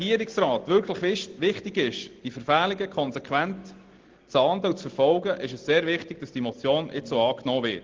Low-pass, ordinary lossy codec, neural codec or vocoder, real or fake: 7.2 kHz; Opus, 16 kbps; none; real